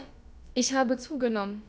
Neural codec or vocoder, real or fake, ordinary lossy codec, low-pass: codec, 16 kHz, about 1 kbps, DyCAST, with the encoder's durations; fake; none; none